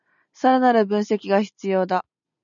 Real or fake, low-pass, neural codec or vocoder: real; 7.2 kHz; none